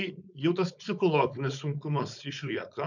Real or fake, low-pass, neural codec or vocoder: fake; 7.2 kHz; codec, 16 kHz, 4.8 kbps, FACodec